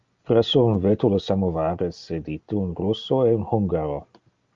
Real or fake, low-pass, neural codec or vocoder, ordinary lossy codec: real; 7.2 kHz; none; Opus, 32 kbps